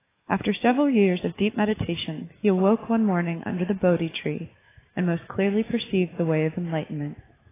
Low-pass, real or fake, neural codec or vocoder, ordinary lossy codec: 3.6 kHz; fake; autoencoder, 48 kHz, 128 numbers a frame, DAC-VAE, trained on Japanese speech; AAC, 16 kbps